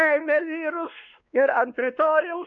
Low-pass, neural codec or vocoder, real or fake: 7.2 kHz; codec, 16 kHz, 2 kbps, X-Codec, WavLM features, trained on Multilingual LibriSpeech; fake